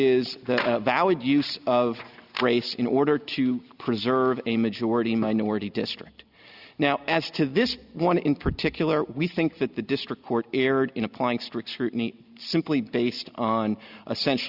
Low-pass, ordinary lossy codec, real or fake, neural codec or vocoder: 5.4 kHz; Opus, 64 kbps; real; none